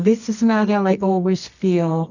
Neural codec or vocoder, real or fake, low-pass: codec, 24 kHz, 0.9 kbps, WavTokenizer, medium music audio release; fake; 7.2 kHz